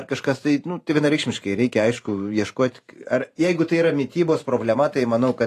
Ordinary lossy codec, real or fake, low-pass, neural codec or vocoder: AAC, 48 kbps; fake; 14.4 kHz; vocoder, 44.1 kHz, 128 mel bands every 512 samples, BigVGAN v2